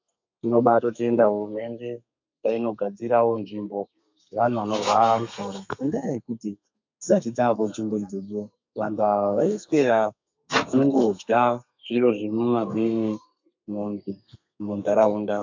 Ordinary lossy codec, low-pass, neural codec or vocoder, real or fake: MP3, 64 kbps; 7.2 kHz; codec, 32 kHz, 1.9 kbps, SNAC; fake